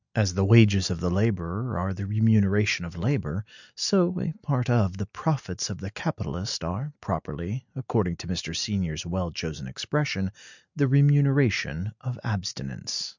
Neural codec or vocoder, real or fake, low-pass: none; real; 7.2 kHz